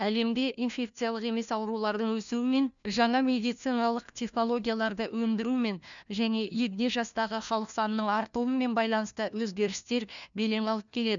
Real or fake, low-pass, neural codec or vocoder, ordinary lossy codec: fake; 7.2 kHz; codec, 16 kHz, 1 kbps, FunCodec, trained on LibriTTS, 50 frames a second; none